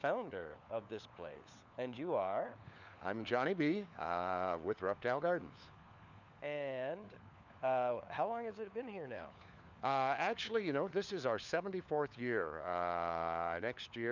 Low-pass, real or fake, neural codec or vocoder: 7.2 kHz; fake; codec, 16 kHz, 4 kbps, FunCodec, trained on LibriTTS, 50 frames a second